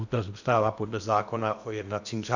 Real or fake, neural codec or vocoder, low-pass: fake; codec, 16 kHz in and 24 kHz out, 0.8 kbps, FocalCodec, streaming, 65536 codes; 7.2 kHz